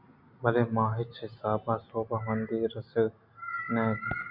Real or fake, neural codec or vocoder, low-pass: real; none; 5.4 kHz